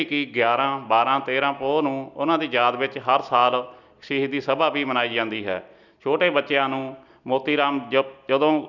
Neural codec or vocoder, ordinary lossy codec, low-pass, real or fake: none; none; 7.2 kHz; real